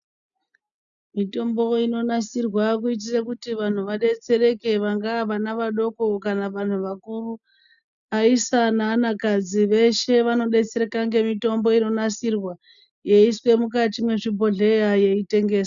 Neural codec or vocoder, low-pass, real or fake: none; 7.2 kHz; real